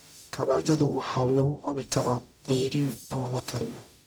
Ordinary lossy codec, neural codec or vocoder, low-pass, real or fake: none; codec, 44.1 kHz, 0.9 kbps, DAC; none; fake